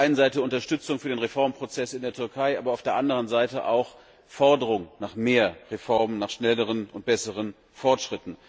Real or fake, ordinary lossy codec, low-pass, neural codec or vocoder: real; none; none; none